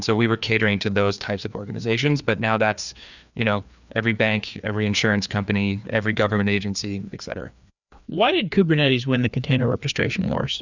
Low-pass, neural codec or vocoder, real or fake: 7.2 kHz; codec, 16 kHz, 2 kbps, FreqCodec, larger model; fake